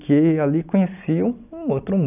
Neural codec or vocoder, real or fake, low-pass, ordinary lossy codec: none; real; 3.6 kHz; none